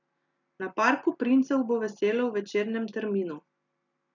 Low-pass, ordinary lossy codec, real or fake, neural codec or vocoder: 7.2 kHz; none; real; none